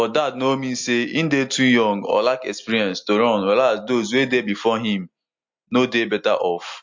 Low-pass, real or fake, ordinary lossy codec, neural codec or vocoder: 7.2 kHz; real; MP3, 48 kbps; none